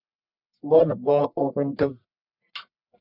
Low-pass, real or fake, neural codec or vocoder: 5.4 kHz; fake; codec, 44.1 kHz, 1.7 kbps, Pupu-Codec